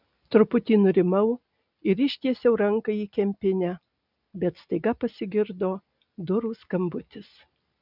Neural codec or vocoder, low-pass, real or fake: none; 5.4 kHz; real